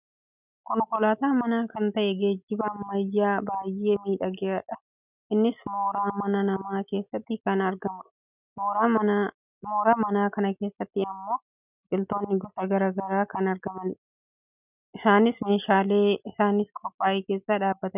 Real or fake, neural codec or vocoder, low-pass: real; none; 3.6 kHz